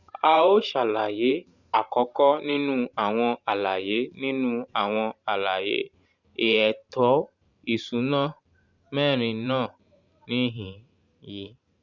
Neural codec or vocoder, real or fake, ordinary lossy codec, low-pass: vocoder, 44.1 kHz, 128 mel bands every 512 samples, BigVGAN v2; fake; Opus, 64 kbps; 7.2 kHz